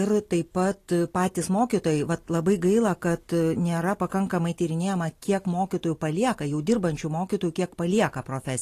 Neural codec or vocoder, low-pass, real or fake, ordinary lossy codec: none; 14.4 kHz; real; AAC, 48 kbps